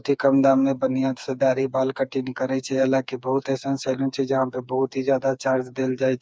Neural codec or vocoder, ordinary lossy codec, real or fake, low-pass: codec, 16 kHz, 4 kbps, FreqCodec, smaller model; none; fake; none